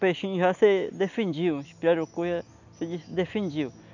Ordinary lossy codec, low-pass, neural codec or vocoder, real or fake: none; 7.2 kHz; none; real